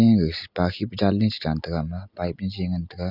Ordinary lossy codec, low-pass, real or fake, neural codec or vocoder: none; 5.4 kHz; real; none